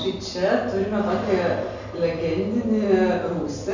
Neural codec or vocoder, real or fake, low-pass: none; real; 7.2 kHz